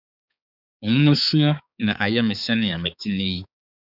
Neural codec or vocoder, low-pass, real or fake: codec, 16 kHz, 4 kbps, X-Codec, HuBERT features, trained on balanced general audio; 5.4 kHz; fake